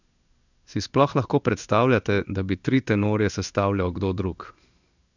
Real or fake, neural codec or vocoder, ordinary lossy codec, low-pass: fake; codec, 16 kHz in and 24 kHz out, 1 kbps, XY-Tokenizer; none; 7.2 kHz